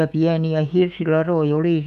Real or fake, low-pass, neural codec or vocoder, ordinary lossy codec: fake; 14.4 kHz; autoencoder, 48 kHz, 128 numbers a frame, DAC-VAE, trained on Japanese speech; none